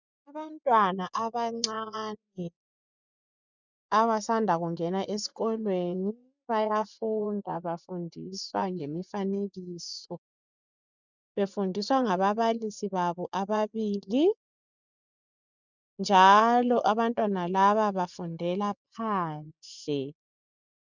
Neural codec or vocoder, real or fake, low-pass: vocoder, 24 kHz, 100 mel bands, Vocos; fake; 7.2 kHz